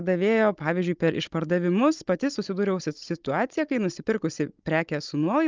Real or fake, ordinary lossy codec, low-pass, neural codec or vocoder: fake; Opus, 24 kbps; 7.2 kHz; codec, 16 kHz, 16 kbps, FunCodec, trained on Chinese and English, 50 frames a second